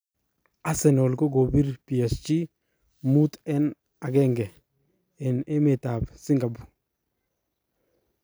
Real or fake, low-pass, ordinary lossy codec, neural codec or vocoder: real; none; none; none